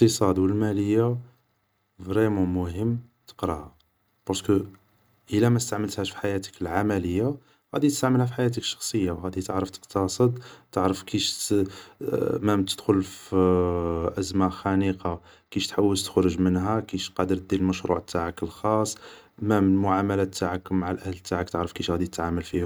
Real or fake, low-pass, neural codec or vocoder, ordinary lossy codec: real; none; none; none